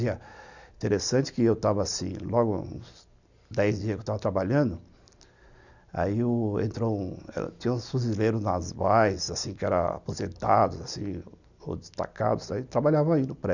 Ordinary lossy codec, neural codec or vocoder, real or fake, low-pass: none; none; real; 7.2 kHz